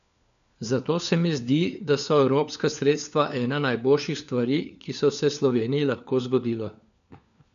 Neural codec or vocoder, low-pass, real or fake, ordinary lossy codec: codec, 16 kHz, 4 kbps, FunCodec, trained on LibriTTS, 50 frames a second; 7.2 kHz; fake; none